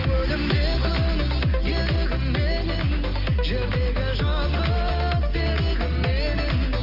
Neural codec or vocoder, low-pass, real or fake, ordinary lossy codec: none; 5.4 kHz; real; Opus, 24 kbps